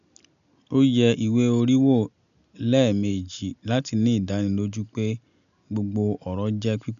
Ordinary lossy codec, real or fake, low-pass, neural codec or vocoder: none; real; 7.2 kHz; none